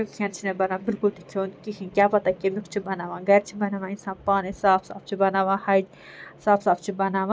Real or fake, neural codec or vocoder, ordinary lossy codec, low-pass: real; none; none; none